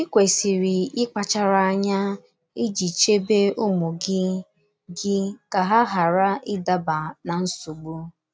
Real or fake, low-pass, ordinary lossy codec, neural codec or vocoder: real; none; none; none